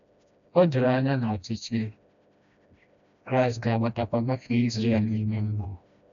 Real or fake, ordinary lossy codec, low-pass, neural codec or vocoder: fake; none; 7.2 kHz; codec, 16 kHz, 1 kbps, FreqCodec, smaller model